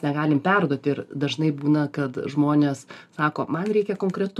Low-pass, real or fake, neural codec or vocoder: 14.4 kHz; real; none